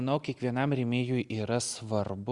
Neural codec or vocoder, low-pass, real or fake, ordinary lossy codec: none; 10.8 kHz; real; Opus, 64 kbps